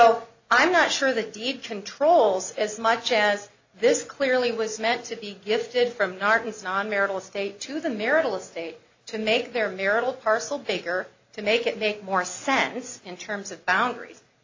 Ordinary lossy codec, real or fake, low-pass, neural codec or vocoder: AAC, 48 kbps; real; 7.2 kHz; none